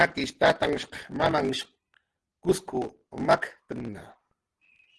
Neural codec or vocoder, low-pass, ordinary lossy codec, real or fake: none; 10.8 kHz; Opus, 16 kbps; real